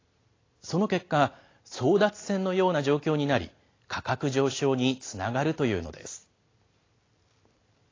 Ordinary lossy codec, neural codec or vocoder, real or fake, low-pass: AAC, 32 kbps; none; real; 7.2 kHz